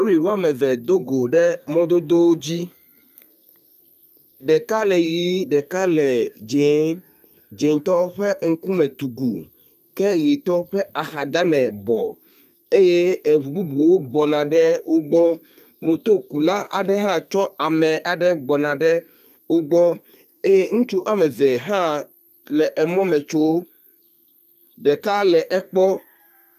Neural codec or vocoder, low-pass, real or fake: codec, 32 kHz, 1.9 kbps, SNAC; 14.4 kHz; fake